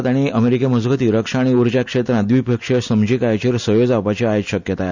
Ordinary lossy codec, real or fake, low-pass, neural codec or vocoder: none; real; 7.2 kHz; none